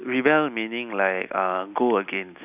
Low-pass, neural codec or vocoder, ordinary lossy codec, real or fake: 3.6 kHz; none; none; real